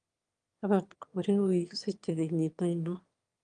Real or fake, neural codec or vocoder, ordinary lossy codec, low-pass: fake; autoencoder, 22.05 kHz, a latent of 192 numbers a frame, VITS, trained on one speaker; Opus, 32 kbps; 9.9 kHz